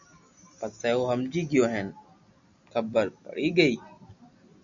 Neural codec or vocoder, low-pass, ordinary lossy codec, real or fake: none; 7.2 kHz; MP3, 96 kbps; real